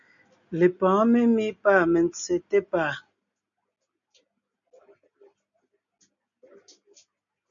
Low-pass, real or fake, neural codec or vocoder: 7.2 kHz; real; none